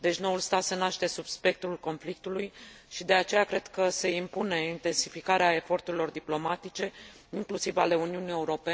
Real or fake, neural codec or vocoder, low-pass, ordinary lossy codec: real; none; none; none